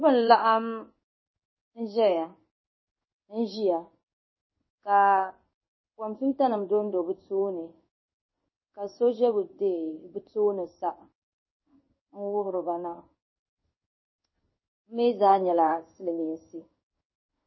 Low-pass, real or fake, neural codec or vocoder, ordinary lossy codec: 7.2 kHz; fake; codec, 16 kHz in and 24 kHz out, 1 kbps, XY-Tokenizer; MP3, 24 kbps